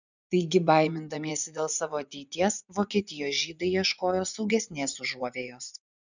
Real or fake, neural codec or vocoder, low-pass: fake; vocoder, 24 kHz, 100 mel bands, Vocos; 7.2 kHz